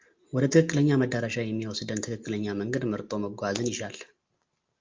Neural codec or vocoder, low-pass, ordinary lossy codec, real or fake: none; 7.2 kHz; Opus, 32 kbps; real